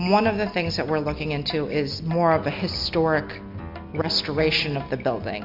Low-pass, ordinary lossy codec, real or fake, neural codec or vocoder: 5.4 kHz; MP3, 48 kbps; real; none